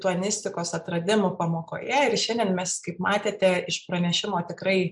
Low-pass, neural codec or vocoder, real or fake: 10.8 kHz; none; real